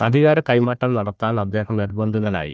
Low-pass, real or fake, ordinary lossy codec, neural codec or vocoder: none; fake; none; codec, 16 kHz, 1 kbps, FunCodec, trained on Chinese and English, 50 frames a second